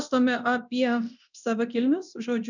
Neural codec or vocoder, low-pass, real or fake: codec, 24 kHz, 0.9 kbps, DualCodec; 7.2 kHz; fake